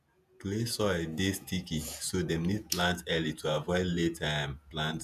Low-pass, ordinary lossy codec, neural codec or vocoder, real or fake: 14.4 kHz; none; vocoder, 48 kHz, 128 mel bands, Vocos; fake